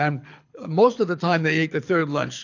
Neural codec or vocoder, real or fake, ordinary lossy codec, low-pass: codec, 24 kHz, 6 kbps, HILCodec; fake; AAC, 48 kbps; 7.2 kHz